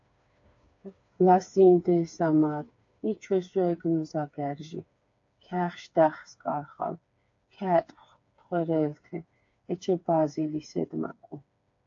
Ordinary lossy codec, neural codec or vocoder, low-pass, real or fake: AAC, 48 kbps; codec, 16 kHz, 4 kbps, FreqCodec, smaller model; 7.2 kHz; fake